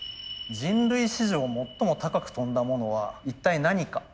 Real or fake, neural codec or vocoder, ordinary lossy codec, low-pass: real; none; none; none